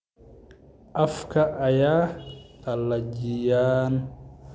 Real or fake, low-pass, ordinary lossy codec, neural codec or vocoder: real; none; none; none